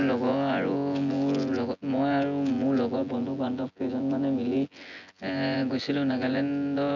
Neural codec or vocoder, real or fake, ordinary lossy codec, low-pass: vocoder, 24 kHz, 100 mel bands, Vocos; fake; none; 7.2 kHz